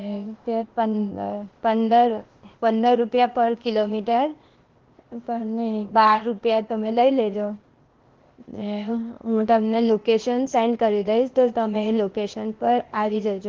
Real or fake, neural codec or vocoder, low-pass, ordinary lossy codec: fake; codec, 16 kHz, 0.8 kbps, ZipCodec; 7.2 kHz; Opus, 16 kbps